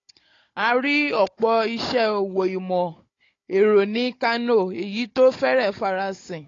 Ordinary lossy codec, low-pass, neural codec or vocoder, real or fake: AAC, 32 kbps; 7.2 kHz; codec, 16 kHz, 16 kbps, FunCodec, trained on Chinese and English, 50 frames a second; fake